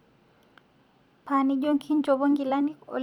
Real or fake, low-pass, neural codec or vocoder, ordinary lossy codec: fake; 19.8 kHz; vocoder, 44.1 kHz, 128 mel bands every 256 samples, BigVGAN v2; none